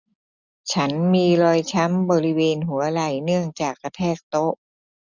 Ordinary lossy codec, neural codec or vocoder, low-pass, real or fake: none; none; 7.2 kHz; real